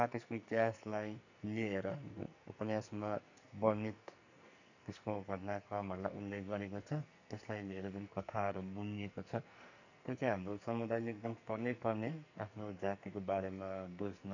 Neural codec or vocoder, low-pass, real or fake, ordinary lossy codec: codec, 44.1 kHz, 2.6 kbps, SNAC; 7.2 kHz; fake; none